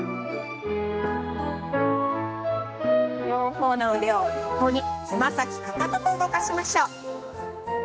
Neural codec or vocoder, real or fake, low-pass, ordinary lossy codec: codec, 16 kHz, 2 kbps, X-Codec, HuBERT features, trained on general audio; fake; none; none